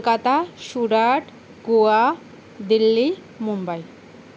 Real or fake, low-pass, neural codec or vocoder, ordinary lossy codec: real; none; none; none